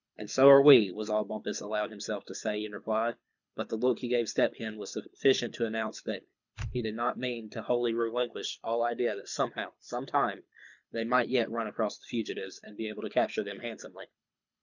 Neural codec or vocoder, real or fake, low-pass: codec, 24 kHz, 6 kbps, HILCodec; fake; 7.2 kHz